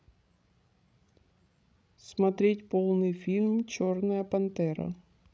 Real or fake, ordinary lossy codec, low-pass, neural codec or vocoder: fake; none; none; codec, 16 kHz, 16 kbps, FreqCodec, larger model